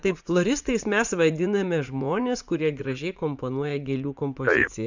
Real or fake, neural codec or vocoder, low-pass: fake; vocoder, 44.1 kHz, 80 mel bands, Vocos; 7.2 kHz